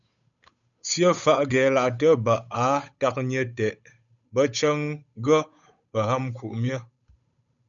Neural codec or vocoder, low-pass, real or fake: codec, 16 kHz, 8 kbps, FunCodec, trained on Chinese and English, 25 frames a second; 7.2 kHz; fake